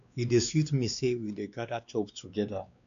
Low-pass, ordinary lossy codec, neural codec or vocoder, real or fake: 7.2 kHz; MP3, 96 kbps; codec, 16 kHz, 2 kbps, X-Codec, WavLM features, trained on Multilingual LibriSpeech; fake